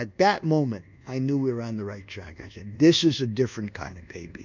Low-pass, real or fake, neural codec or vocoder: 7.2 kHz; fake; codec, 24 kHz, 1.2 kbps, DualCodec